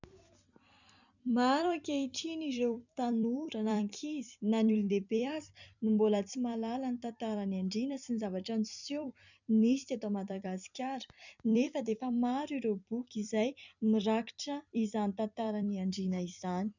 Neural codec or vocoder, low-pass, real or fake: vocoder, 44.1 kHz, 128 mel bands every 256 samples, BigVGAN v2; 7.2 kHz; fake